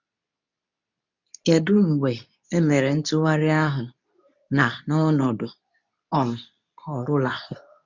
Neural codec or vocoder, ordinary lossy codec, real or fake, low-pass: codec, 24 kHz, 0.9 kbps, WavTokenizer, medium speech release version 2; none; fake; 7.2 kHz